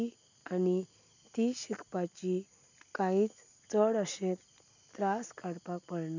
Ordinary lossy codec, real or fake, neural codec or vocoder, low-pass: none; real; none; 7.2 kHz